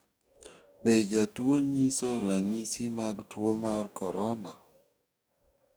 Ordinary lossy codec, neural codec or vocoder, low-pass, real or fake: none; codec, 44.1 kHz, 2.6 kbps, DAC; none; fake